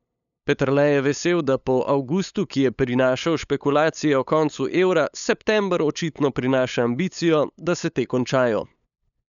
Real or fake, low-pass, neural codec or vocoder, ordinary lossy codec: fake; 7.2 kHz; codec, 16 kHz, 8 kbps, FunCodec, trained on LibriTTS, 25 frames a second; none